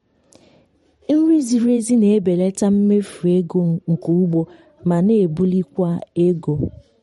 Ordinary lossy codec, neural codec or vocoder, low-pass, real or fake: MP3, 48 kbps; vocoder, 44.1 kHz, 128 mel bands every 512 samples, BigVGAN v2; 19.8 kHz; fake